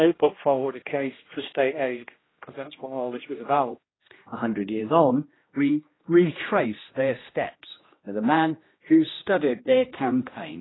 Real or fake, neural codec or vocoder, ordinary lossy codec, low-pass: fake; codec, 16 kHz, 1 kbps, X-Codec, HuBERT features, trained on general audio; AAC, 16 kbps; 7.2 kHz